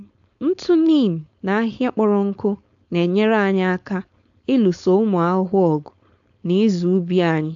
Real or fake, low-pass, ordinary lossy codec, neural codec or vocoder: fake; 7.2 kHz; AAC, 64 kbps; codec, 16 kHz, 4.8 kbps, FACodec